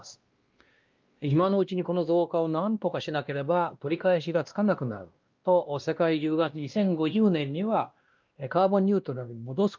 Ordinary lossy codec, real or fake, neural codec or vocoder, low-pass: Opus, 24 kbps; fake; codec, 16 kHz, 1 kbps, X-Codec, WavLM features, trained on Multilingual LibriSpeech; 7.2 kHz